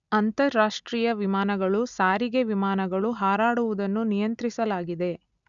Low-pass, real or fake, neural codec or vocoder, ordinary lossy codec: 7.2 kHz; real; none; none